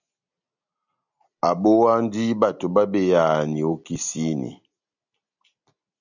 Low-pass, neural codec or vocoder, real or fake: 7.2 kHz; none; real